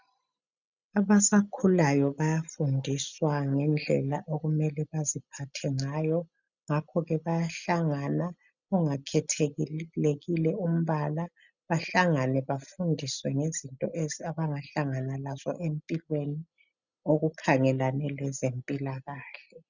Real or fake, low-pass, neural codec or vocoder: real; 7.2 kHz; none